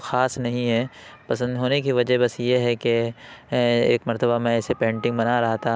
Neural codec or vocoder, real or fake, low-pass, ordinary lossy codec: none; real; none; none